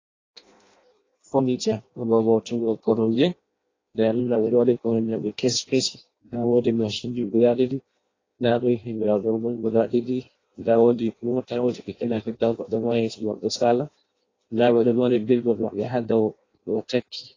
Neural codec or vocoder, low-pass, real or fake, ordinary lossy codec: codec, 16 kHz in and 24 kHz out, 0.6 kbps, FireRedTTS-2 codec; 7.2 kHz; fake; AAC, 32 kbps